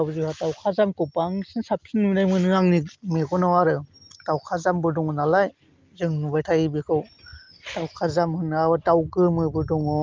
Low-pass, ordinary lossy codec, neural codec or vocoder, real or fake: 7.2 kHz; Opus, 32 kbps; none; real